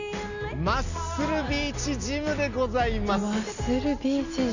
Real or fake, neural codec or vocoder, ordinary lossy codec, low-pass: real; none; none; 7.2 kHz